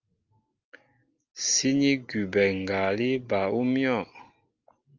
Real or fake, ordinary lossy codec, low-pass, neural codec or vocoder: real; Opus, 32 kbps; 7.2 kHz; none